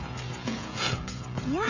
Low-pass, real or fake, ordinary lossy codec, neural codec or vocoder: 7.2 kHz; fake; none; codec, 16 kHz, 16 kbps, FreqCodec, smaller model